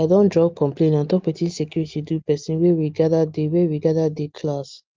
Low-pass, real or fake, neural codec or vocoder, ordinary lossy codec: 7.2 kHz; real; none; Opus, 24 kbps